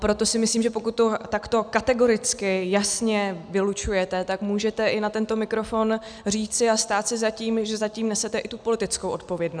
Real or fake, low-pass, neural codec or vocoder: real; 9.9 kHz; none